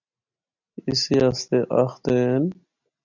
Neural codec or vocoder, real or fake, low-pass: none; real; 7.2 kHz